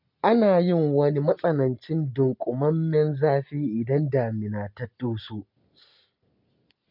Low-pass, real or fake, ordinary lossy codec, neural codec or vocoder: 5.4 kHz; real; none; none